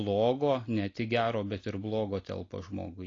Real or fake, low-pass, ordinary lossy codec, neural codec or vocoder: real; 7.2 kHz; AAC, 32 kbps; none